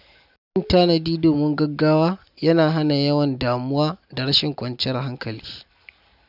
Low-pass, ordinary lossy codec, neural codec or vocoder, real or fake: 5.4 kHz; none; none; real